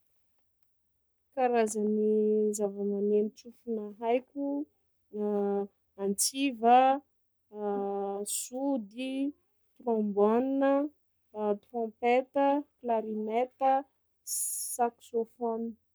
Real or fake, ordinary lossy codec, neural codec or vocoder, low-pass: fake; none; codec, 44.1 kHz, 7.8 kbps, Pupu-Codec; none